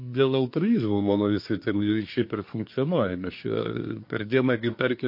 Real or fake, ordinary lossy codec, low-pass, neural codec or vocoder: fake; MP3, 32 kbps; 5.4 kHz; codec, 24 kHz, 1 kbps, SNAC